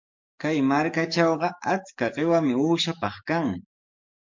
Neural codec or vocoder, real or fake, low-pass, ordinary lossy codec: codec, 44.1 kHz, 7.8 kbps, DAC; fake; 7.2 kHz; MP3, 48 kbps